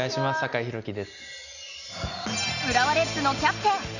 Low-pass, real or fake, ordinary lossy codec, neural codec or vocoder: 7.2 kHz; real; none; none